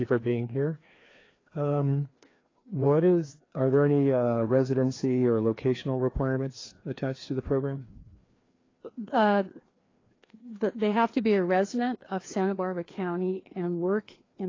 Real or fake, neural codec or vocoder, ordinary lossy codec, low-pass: fake; codec, 16 kHz, 2 kbps, FreqCodec, larger model; AAC, 32 kbps; 7.2 kHz